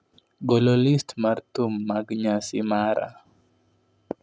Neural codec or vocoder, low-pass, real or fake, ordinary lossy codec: none; none; real; none